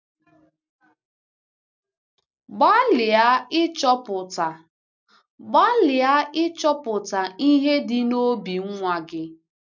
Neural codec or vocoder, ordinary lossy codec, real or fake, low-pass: none; none; real; 7.2 kHz